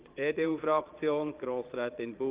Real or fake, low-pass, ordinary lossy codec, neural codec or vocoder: fake; 3.6 kHz; Opus, 32 kbps; vocoder, 22.05 kHz, 80 mel bands, Vocos